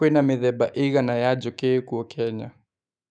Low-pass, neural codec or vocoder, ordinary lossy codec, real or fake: 9.9 kHz; autoencoder, 48 kHz, 128 numbers a frame, DAC-VAE, trained on Japanese speech; none; fake